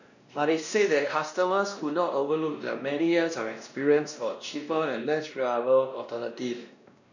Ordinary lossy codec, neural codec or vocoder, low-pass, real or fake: none; codec, 16 kHz, 1 kbps, X-Codec, WavLM features, trained on Multilingual LibriSpeech; 7.2 kHz; fake